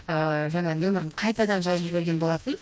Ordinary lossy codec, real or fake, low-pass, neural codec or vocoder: none; fake; none; codec, 16 kHz, 1 kbps, FreqCodec, smaller model